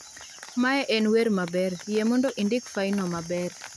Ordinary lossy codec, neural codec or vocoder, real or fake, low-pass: none; none; real; none